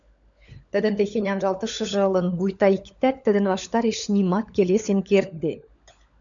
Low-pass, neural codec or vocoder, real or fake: 7.2 kHz; codec, 16 kHz, 16 kbps, FunCodec, trained on LibriTTS, 50 frames a second; fake